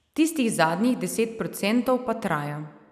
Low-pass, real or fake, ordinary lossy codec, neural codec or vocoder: 14.4 kHz; real; none; none